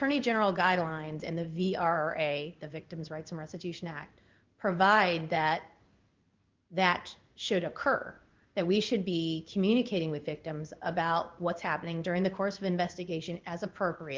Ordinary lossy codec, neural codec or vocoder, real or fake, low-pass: Opus, 24 kbps; codec, 16 kHz in and 24 kHz out, 1 kbps, XY-Tokenizer; fake; 7.2 kHz